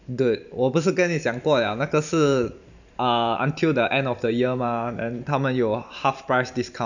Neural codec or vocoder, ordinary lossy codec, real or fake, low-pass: vocoder, 44.1 kHz, 128 mel bands every 512 samples, BigVGAN v2; none; fake; 7.2 kHz